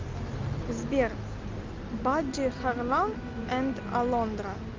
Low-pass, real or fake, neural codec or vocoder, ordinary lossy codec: 7.2 kHz; real; none; Opus, 24 kbps